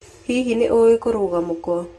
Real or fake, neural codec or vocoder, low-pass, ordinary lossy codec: real; none; 14.4 kHz; AAC, 32 kbps